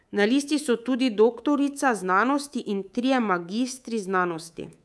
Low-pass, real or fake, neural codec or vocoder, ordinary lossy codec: none; fake; codec, 24 kHz, 3.1 kbps, DualCodec; none